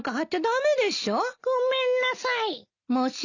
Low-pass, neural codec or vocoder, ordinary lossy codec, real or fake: 7.2 kHz; none; none; real